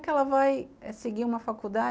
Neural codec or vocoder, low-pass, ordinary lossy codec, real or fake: none; none; none; real